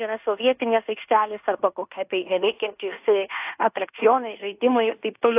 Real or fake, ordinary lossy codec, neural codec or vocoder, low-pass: fake; AAC, 32 kbps; codec, 16 kHz in and 24 kHz out, 0.9 kbps, LongCat-Audio-Codec, fine tuned four codebook decoder; 3.6 kHz